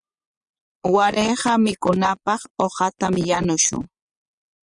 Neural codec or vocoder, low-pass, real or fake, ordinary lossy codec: vocoder, 24 kHz, 100 mel bands, Vocos; 10.8 kHz; fake; Opus, 64 kbps